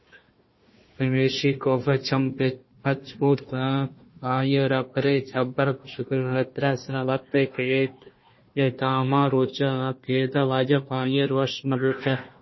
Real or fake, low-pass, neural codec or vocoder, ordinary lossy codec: fake; 7.2 kHz; codec, 16 kHz, 1 kbps, FunCodec, trained on Chinese and English, 50 frames a second; MP3, 24 kbps